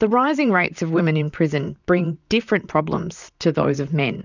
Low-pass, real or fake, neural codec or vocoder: 7.2 kHz; fake; vocoder, 44.1 kHz, 128 mel bands, Pupu-Vocoder